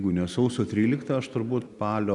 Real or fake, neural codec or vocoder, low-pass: real; none; 10.8 kHz